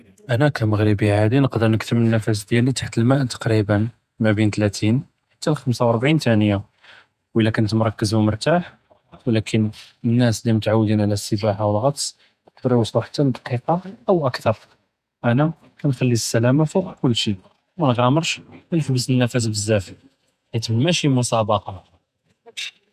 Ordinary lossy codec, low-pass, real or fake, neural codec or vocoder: none; 14.4 kHz; fake; vocoder, 48 kHz, 128 mel bands, Vocos